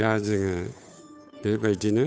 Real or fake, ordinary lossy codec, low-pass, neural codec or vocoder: fake; none; none; codec, 16 kHz, 8 kbps, FunCodec, trained on Chinese and English, 25 frames a second